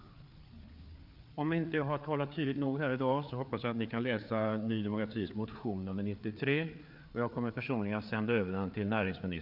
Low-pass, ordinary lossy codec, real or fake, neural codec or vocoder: 5.4 kHz; none; fake; codec, 16 kHz, 4 kbps, FreqCodec, larger model